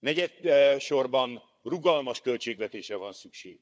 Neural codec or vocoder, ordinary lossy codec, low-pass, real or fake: codec, 16 kHz, 4 kbps, FunCodec, trained on Chinese and English, 50 frames a second; none; none; fake